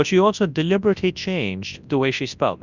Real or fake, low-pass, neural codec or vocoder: fake; 7.2 kHz; codec, 24 kHz, 0.9 kbps, WavTokenizer, large speech release